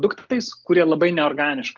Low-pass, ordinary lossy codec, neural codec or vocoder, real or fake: 7.2 kHz; Opus, 32 kbps; none; real